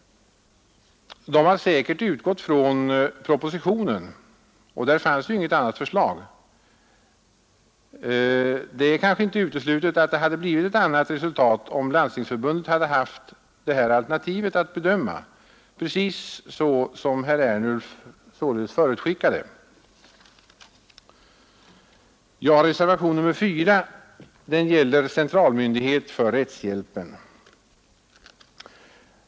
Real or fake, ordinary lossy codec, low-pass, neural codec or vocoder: real; none; none; none